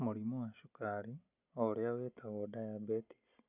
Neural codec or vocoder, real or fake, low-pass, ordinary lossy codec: none; real; 3.6 kHz; none